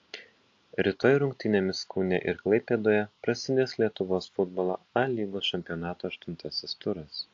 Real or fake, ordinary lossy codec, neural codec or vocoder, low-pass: real; AAC, 48 kbps; none; 7.2 kHz